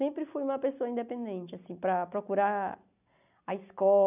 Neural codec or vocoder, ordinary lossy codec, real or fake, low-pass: none; none; real; 3.6 kHz